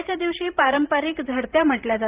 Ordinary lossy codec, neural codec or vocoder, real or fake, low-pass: Opus, 32 kbps; vocoder, 44.1 kHz, 128 mel bands every 512 samples, BigVGAN v2; fake; 3.6 kHz